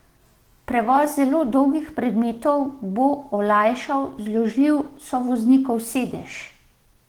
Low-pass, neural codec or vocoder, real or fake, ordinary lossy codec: 19.8 kHz; autoencoder, 48 kHz, 128 numbers a frame, DAC-VAE, trained on Japanese speech; fake; Opus, 16 kbps